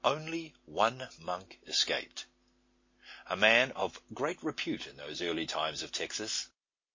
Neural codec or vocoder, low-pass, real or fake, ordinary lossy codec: none; 7.2 kHz; real; MP3, 32 kbps